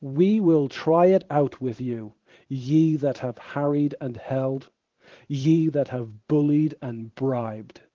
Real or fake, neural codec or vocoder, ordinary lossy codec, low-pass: fake; vocoder, 44.1 kHz, 128 mel bands every 512 samples, BigVGAN v2; Opus, 16 kbps; 7.2 kHz